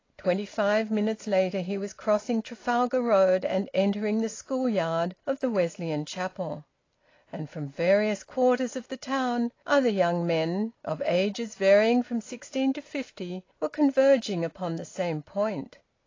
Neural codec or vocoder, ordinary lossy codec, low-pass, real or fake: none; AAC, 32 kbps; 7.2 kHz; real